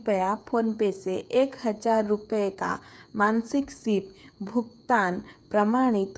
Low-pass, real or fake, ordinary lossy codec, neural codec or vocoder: none; fake; none; codec, 16 kHz, 8 kbps, FreqCodec, smaller model